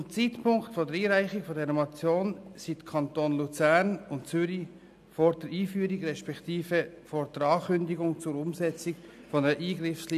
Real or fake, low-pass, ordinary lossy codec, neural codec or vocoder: real; 14.4 kHz; none; none